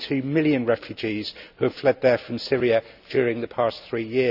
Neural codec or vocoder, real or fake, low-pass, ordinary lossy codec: none; real; 5.4 kHz; none